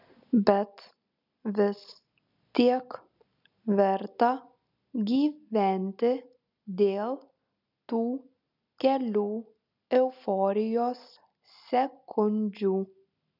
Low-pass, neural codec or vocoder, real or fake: 5.4 kHz; none; real